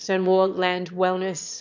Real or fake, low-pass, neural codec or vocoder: fake; 7.2 kHz; autoencoder, 22.05 kHz, a latent of 192 numbers a frame, VITS, trained on one speaker